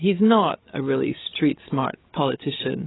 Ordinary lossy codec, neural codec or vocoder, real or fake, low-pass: AAC, 16 kbps; codec, 16 kHz, 8 kbps, FreqCodec, larger model; fake; 7.2 kHz